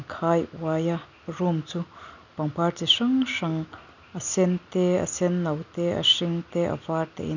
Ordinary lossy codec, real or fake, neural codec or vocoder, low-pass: none; real; none; 7.2 kHz